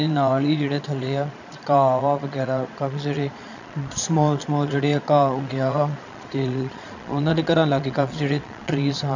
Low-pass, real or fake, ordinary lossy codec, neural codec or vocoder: 7.2 kHz; fake; none; vocoder, 22.05 kHz, 80 mel bands, Vocos